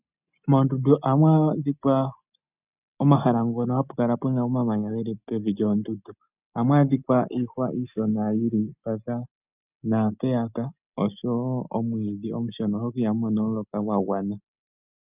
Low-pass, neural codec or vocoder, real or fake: 3.6 kHz; none; real